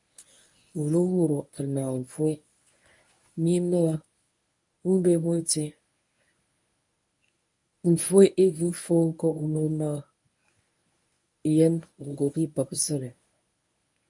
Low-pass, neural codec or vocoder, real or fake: 10.8 kHz; codec, 24 kHz, 0.9 kbps, WavTokenizer, medium speech release version 1; fake